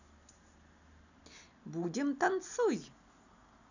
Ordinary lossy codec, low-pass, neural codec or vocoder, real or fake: none; 7.2 kHz; none; real